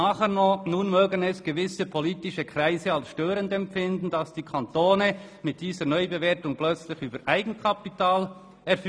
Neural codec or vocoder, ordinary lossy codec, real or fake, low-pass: none; none; real; none